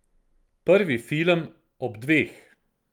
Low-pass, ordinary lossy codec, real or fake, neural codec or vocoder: 19.8 kHz; Opus, 32 kbps; real; none